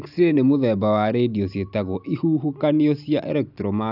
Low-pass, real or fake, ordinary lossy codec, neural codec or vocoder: 5.4 kHz; real; none; none